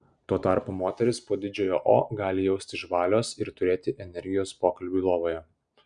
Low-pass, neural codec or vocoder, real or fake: 9.9 kHz; none; real